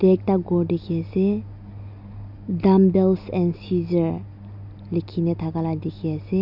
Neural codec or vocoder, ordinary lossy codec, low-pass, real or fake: none; none; 5.4 kHz; real